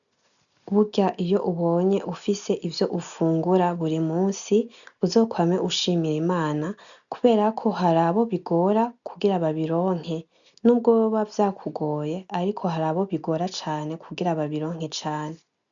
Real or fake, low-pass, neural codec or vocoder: real; 7.2 kHz; none